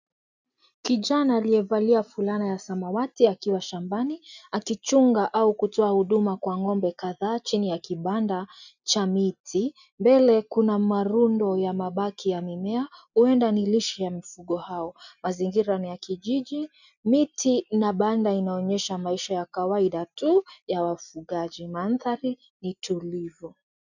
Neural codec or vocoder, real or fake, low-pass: none; real; 7.2 kHz